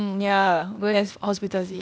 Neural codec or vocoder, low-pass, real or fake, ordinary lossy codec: codec, 16 kHz, 0.8 kbps, ZipCodec; none; fake; none